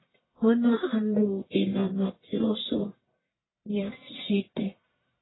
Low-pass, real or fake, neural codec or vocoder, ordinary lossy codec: 7.2 kHz; fake; codec, 44.1 kHz, 1.7 kbps, Pupu-Codec; AAC, 16 kbps